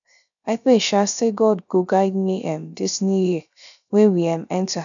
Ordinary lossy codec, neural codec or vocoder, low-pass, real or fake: none; codec, 16 kHz, 0.3 kbps, FocalCodec; 7.2 kHz; fake